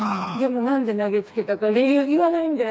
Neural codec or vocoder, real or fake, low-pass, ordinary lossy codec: codec, 16 kHz, 2 kbps, FreqCodec, smaller model; fake; none; none